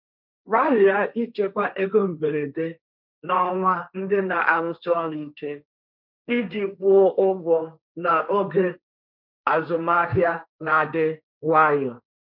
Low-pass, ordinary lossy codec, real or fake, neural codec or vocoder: 5.4 kHz; none; fake; codec, 16 kHz, 1.1 kbps, Voila-Tokenizer